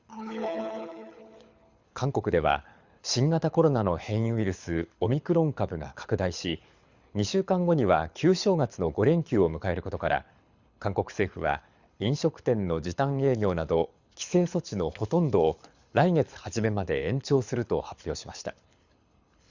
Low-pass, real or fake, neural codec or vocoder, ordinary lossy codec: 7.2 kHz; fake; codec, 24 kHz, 6 kbps, HILCodec; Opus, 64 kbps